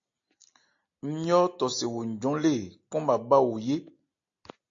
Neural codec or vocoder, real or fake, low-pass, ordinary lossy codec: none; real; 7.2 kHz; AAC, 48 kbps